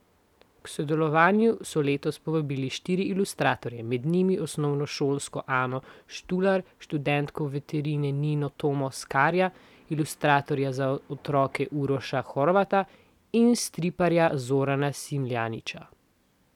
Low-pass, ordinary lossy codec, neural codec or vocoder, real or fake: 19.8 kHz; none; none; real